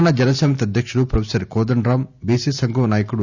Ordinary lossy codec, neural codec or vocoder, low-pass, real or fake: none; none; 7.2 kHz; real